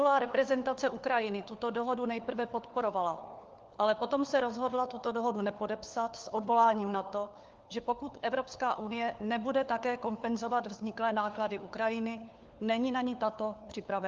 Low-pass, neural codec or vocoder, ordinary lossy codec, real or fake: 7.2 kHz; codec, 16 kHz, 4 kbps, FunCodec, trained on LibriTTS, 50 frames a second; Opus, 32 kbps; fake